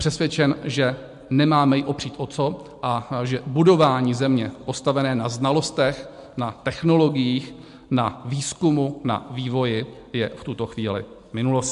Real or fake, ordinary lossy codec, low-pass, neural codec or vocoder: real; MP3, 64 kbps; 10.8 kHz; none